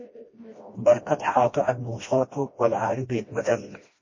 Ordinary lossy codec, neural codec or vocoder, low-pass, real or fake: MP3, 32 kbps; codec, 16 kHz, 1 kbps, FreqCodec, smaller model; 7.2 kHz; fake